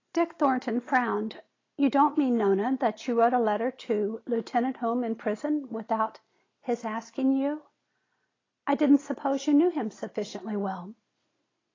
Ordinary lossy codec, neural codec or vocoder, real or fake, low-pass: AAC, 32 kbps; none; real; 7.2 kHz